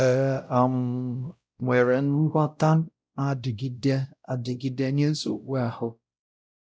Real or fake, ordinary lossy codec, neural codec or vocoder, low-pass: fake; none; codec, 16 kHz, 0.5 kbps, X-Codec, WavLM features, trained on Multilingual LibriSpeech; none